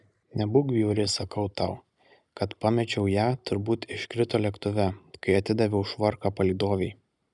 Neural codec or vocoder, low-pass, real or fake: none; 10.8 kHz; real